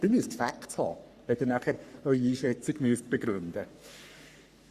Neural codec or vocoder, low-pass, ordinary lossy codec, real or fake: codec, 44.1 kHz, 3.4 kbps, Pupu-Codec; 14.4 kHz; Opus, 64 kbps; fake